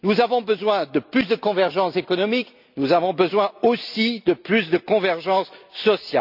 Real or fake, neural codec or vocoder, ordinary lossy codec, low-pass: real; none; none; 5.4 kHz